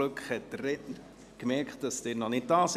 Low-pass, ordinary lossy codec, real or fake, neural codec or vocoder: 14.4 kHz; none; real; none